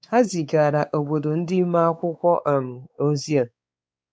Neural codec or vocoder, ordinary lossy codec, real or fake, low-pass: codec, 16 kHz, 4 kbps, X-Codec, WavLM features, trained on Multilingual LibriSpeech; none; fake; none